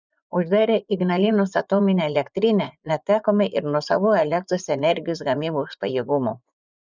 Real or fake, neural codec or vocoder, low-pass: fake; vocoder, 24 kHz, 100 mel bands, Vocos; 7.2 kHz